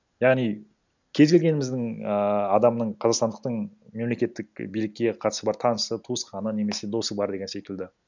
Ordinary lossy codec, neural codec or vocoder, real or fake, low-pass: none; none; real; 7.2 kHz